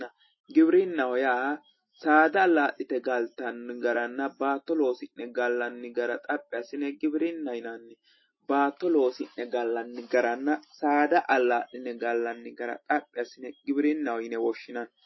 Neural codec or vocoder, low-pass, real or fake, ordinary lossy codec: none; 7.2 kHz; real; MP3, 24 kbps